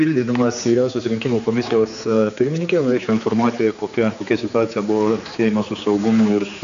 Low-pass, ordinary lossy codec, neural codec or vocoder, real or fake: 7.2 kHz; MP3, 64 kbps; codec, 16 kHz, 4 kbps, X-Codec, HuBERT features, trained on general audio; fake